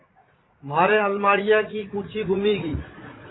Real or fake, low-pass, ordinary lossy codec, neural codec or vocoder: fake; 7.2 kHz; AAC, 16 kbps; vocoder, 44.1 kHz, 80 mel bands, Vocos